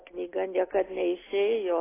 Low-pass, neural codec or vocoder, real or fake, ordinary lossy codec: 3.6 kHz; none; real; AAC, 16 kbps